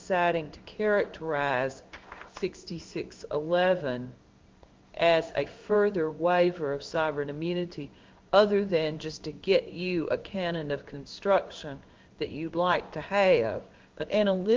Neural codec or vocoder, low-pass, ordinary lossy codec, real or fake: codec, 16 kHz in and 24 kHz out, 1 kbps, XY-Tokenizer; 7.2 kHz; Opus, 24 kbps; fake